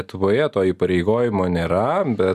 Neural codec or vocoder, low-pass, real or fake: none; 14.4 kHz; real